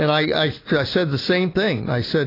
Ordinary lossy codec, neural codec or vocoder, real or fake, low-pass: AAC, 24 kbps; none; real; 5.4 kHz